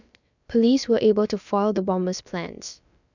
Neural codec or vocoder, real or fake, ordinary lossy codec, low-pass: codec, 16 kHz, about 1 kbps, DyCAST, with the encoder's durations; fake; none; 7.2 kHz